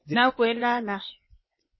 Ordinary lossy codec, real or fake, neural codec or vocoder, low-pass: MP3, 24 kbps; fake; codec, 16 kHz, 0.8 kbps, ZipCodec; 7.2 kHz